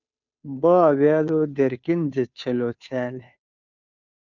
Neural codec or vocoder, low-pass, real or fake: codec, 16 kHz, 2 kbps, FunCodec, trained on Chinese and English, 25 frames a second; 7.2 kHz; fake